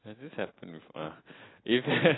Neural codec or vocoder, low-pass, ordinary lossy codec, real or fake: none; 7.2 kHz; AAC, 16 kbps; real